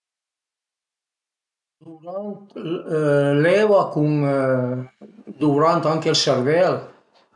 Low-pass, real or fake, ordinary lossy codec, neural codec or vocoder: 10.8 kHz; real; none; none